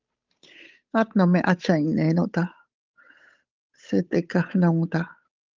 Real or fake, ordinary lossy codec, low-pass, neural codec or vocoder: fake; Opus, 24 kbps; 7.2 kHz; codec, 16 kHz, 8 kbps, FunCodec, trained on Chinese and English, 25 frames a second